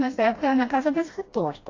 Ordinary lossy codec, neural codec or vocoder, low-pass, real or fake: AAC, 32 kbps; codec, 16 kHz, 1 kbps, FreqCodec, smaller model; 7.2 kHz; fake